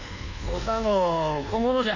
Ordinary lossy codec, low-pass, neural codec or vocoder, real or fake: none; 7.2 kHz; codec, 24 kHz, 1.2 kbps, DualCodec; fake